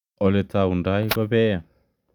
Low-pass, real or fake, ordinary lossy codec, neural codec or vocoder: 19.8 kHz; real; none; none